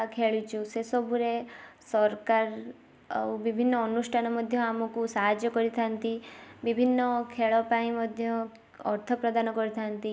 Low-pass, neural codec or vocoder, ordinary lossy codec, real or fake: none; none; none; real